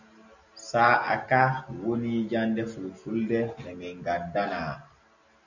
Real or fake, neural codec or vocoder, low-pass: real; none; 7.2 kHz